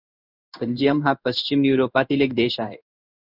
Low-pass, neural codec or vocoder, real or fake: 5.4 kHz; codec, 16 kHz in and 24 kHz out, 1 kbps, XY-Tokenizer; fake